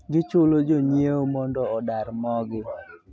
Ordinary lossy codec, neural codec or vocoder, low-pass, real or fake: none; none; none; real